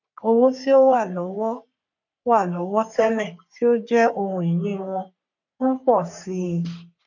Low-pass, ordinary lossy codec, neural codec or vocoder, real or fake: 7.2 kHz; none; codec, 44.1 kHz, 3.4 kbps, Pupu-Codec; fake